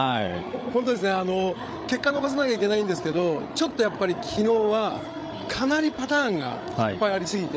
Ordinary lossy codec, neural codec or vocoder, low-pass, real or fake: none; codec, 16 kHz, 8 kbps, FreqCodec, larger model; none; fake